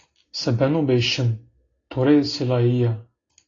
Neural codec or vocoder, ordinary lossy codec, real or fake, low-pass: none; AAC, 32 kbps; real; 7.2 kHz